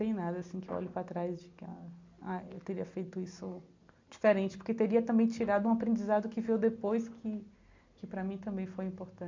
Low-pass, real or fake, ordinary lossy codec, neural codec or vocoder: 7.2 kHz; real; MP3, 64 kbps; none